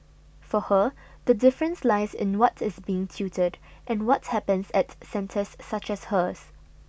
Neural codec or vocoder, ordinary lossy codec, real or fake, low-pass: none; none; real; none